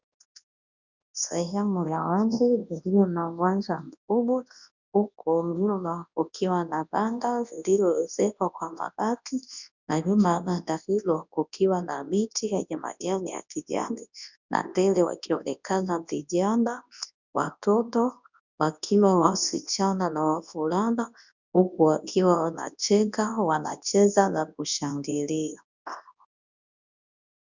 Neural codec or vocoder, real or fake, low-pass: codec, 24 kHz, 0.9 kbps, WavTokenizer, large speech release; fake; 7.2 kHz